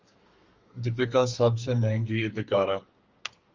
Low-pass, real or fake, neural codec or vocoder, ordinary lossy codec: 7.2 kHz; fake; codec, 32 kHz, 1.9 kbps, SNAC; Opus, 32 kbps